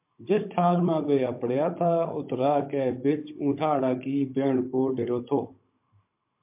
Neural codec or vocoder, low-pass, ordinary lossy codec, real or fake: codec, 16 kHz, 16 kbps, FunCodec, trained on Chinese and English, 50 frames a second; 3.6 kHz; MP3, 32 kbps; fake